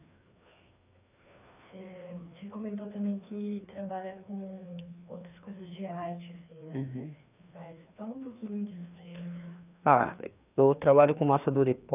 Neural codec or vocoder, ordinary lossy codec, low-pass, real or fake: codec, 16 kHz, 2 kbps, FreqCodec, larger model; none; 3.6 kHz; fake